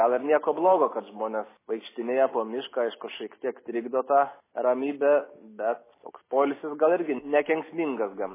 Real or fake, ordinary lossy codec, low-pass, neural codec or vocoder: real; MP3, 16 kbps; 3.6 kHz; none